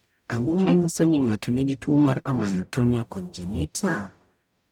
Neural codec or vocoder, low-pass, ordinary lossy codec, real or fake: codec, 44.1 kHz, 0.9 kbps, DAC; 19.8 kHz; none; fake